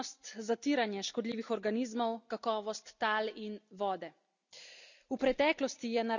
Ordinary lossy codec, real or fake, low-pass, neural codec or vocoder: none; real; 7.2 kHz; none